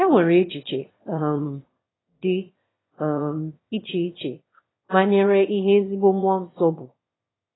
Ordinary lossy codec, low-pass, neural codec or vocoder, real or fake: AAC, 16 kbps; 7.2 kHz; autoencoder, 22.05 kHz, a latent of 192 numbers a frame, VITS, trained on one speaker; fake